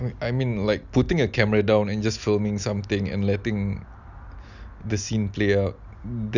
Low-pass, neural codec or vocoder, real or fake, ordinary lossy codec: 7.2 kHz; none; real; none